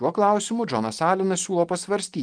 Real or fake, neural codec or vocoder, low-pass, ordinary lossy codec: real; none; 9.9 kHz; Opus, 24 kbps